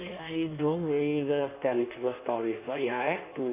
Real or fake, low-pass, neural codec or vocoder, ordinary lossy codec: fake; 3.6 kHz; codec, 16 kHz in and 24 kHz out, 1.1 kbps, FireRedTTS-2 codec; none